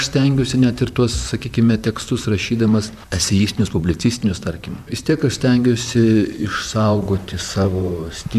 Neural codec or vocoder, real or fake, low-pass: vocoder, 44.1 kHz, 128 mel bands, Pupu-Vocoder; fake; 14.4 kHz